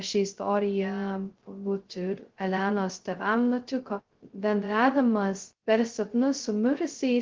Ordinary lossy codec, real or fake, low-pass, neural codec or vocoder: Opus, 16 kbps; fake; 7.2 kHz; codec, 16 kHz, 0.2 kbps, FocalCodec